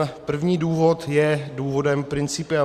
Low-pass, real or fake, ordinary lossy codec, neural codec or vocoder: 14.4 kHz; fake; Opus, 64 kbps; vocoder, 44.1 kHz, 128 mel bands every 512 samples, BigVGAN v2